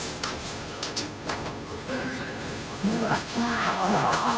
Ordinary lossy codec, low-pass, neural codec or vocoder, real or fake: none; none; codec, 16 kHz, 0.5 kbps, FunCodec, trained on Chinese and English, 25 frames a second; fake